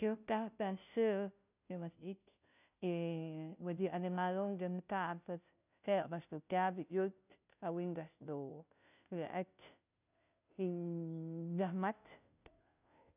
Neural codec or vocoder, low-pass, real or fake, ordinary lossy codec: codec, 16 kHz, 0.5 kbps, FunCodec, trained on Chinese and English, 25 frames a second; 3.6 kHz; fake; none